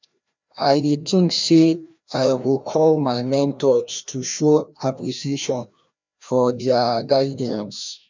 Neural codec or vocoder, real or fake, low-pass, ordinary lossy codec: codec, 16 kHz, 1 kbps, FreqCodec, larger model; fake; 7.2 kHz; MP3, 64 kbps